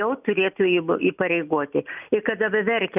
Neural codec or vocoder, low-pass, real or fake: none; 3.6 kHz; real